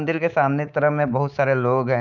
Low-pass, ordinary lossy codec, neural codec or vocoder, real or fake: 7.2 kHz; none; codec, 24 kHz, 3.1 kbps, DualCodec; fake